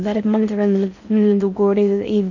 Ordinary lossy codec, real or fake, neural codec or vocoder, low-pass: none; fake; codec, 16 kHz in and 24 kHz out, 0.6 kbps, FocalCodec, streaming, 4096 codes; 7.2 kHz